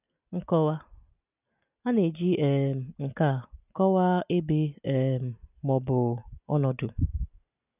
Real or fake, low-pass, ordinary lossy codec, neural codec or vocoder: real; 3.6 kHz; none; none